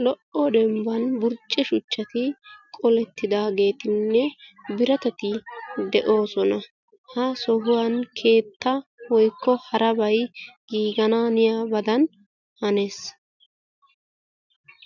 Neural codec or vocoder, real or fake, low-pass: none; real; 7.2 kHz